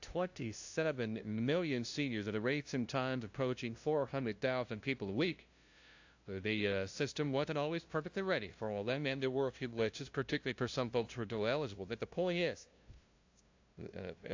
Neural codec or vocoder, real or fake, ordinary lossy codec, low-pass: codec, 16 kHz, 0.5 kbps, FunCodec, trained on LibriTTS, 25 frames a second; fake; AAC, 48 kbps; 7.2 kHz